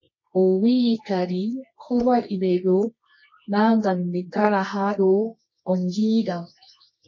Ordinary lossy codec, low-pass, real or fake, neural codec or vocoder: MP3, 32 kbps; 7.2 kHz; fake; codec, 24 kHz, 0.9 kbps, WavTokenizer, medium music audio release